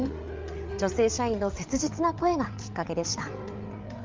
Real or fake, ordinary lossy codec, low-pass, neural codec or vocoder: fake; Opus, 32 kbps; 7.2 kHz; codec, 16 kHz, 8 kbps, FreqCodec, larger model